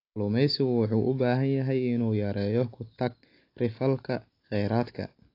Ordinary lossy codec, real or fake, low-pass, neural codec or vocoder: AAC, 32 kbps; real; 5.4 kHz; none